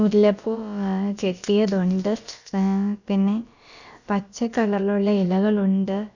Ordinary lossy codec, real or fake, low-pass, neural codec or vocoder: none; fake; 7.2 kHz; codec, 16 kHz, about 1 kbps, DyCAST, with the encoder's durations